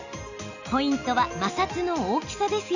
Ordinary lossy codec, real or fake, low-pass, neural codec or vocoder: none; real; 7.2 kHz; none